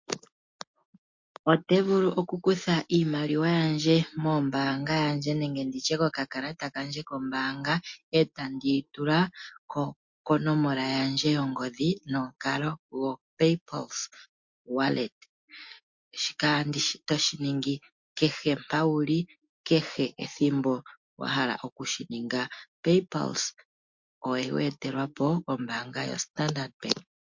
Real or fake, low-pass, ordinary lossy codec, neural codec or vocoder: real; 7.2 kHz; MP3, 48 kbps; none